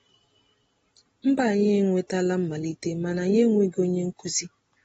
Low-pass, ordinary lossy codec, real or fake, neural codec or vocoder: 19.8 kHz; AAC, 24 kbps; real; none